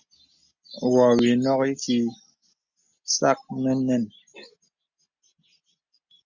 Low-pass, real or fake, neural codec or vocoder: 7.2 kHz; real; none